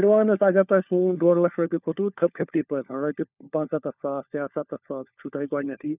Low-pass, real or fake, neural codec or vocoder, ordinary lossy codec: 3.6 kHz; fake; codec, 16 kHz, 4 kbps, FunCodec, trained on LibriTTS, 50 frames a second; none